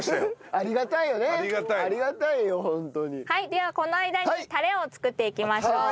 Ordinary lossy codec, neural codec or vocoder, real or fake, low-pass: none; none; real; none